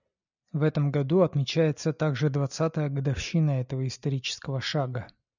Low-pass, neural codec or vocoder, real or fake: 7.2 kHz; none; real